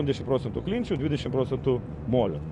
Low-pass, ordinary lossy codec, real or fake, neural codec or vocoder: 10.8 kHz; MP3, 96 kbps; fake; vocoder, 24 kHz, 100 mel bands, Vocos